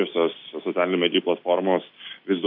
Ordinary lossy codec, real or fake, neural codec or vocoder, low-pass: MP3, 48 kbps; real; none; 5.4 kHz